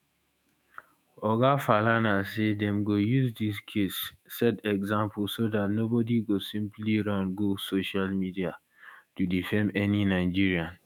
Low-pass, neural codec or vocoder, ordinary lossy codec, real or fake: none; autoencoder, 48 kHz, 128 numbers a frame, DAC-VAE, trained on Japanese speech; none; fake